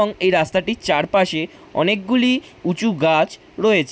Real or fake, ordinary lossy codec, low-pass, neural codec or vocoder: real; none; none; none